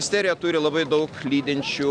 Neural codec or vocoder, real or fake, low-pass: none; real; 9.9 kHz